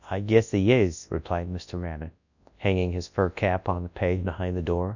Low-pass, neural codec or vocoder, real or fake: 7.2 kHz; codec, 24 kHz, 0.9 kbps, WavTokenizer, large speech release; fake